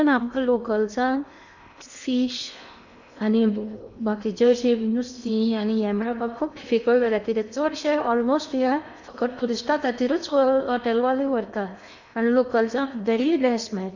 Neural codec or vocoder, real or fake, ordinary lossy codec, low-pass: codec, 16 kHz in and 24 kHz out, 0.8 kbps, FocalCodec, streaming, 65536 codes; fake; none; 7.2 kHz